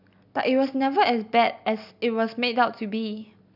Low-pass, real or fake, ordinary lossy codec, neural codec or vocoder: 5.4 kHz; real; none; none